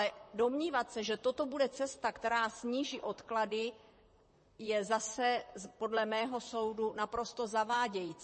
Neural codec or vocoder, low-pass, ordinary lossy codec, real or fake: vocoder, 44.1 kHz, 128 mel bands, Pupu-Vocoder; 10.8 kHz; MP3, 32 kbps; fake